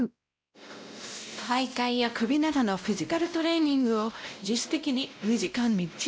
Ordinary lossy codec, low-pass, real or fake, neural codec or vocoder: none; none; fake; codec, 16 kHz, 0.5 kbps, X-Codec, WavLM features, trained on Multilingual LibriSpeech